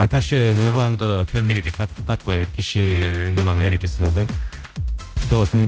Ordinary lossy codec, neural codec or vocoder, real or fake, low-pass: none; codec, 16 kHz, 0.5 kbps, X-Codec, HuBERT features, trained on general audio; fake; none